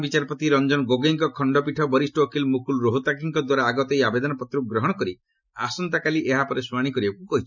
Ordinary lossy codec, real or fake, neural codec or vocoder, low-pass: none; real; none; 7.2 kHz